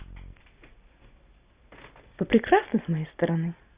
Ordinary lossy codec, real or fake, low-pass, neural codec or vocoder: Opus, 64 kbps; real; 3.6 kHz; none